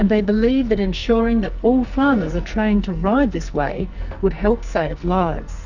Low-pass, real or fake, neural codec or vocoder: 7.2 kHz; fake; codec, 44.1 kHz, 2.6 kbps, SNAC